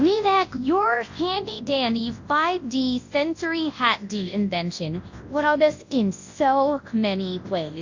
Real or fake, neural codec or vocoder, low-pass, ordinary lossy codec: fake; codec, 24 kHz, 0.9 kbps, WavTokenizer, large speech release; 7.2 kHz; AAC, 48 kbps